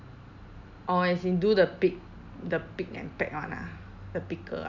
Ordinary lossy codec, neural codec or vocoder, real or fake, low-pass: none; none; real; 7.2 kHz